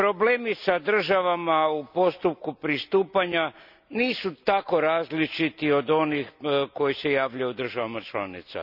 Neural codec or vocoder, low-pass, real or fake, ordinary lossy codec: none; 5.4 kHz; real; none